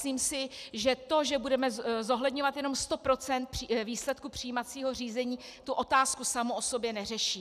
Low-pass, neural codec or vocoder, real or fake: 14.4 kHz; none; real